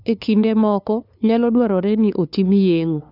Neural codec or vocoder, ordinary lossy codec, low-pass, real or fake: codec, 16 kHz, 2 kbps, FunCodec, trained on LibriTTS, 25 frames a second; none; 5.4 kHz; fake